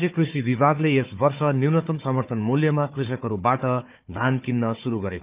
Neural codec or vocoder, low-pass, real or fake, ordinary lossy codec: codec, 16 kHz, 4 kbps, FunCodec, trained on Chinese and English, 50 frames a second; 3.6 kHz; fake; Opus, 24 kbps